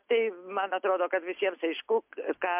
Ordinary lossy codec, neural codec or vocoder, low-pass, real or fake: MP3, 32 kbps; none; 3.6 kHz; real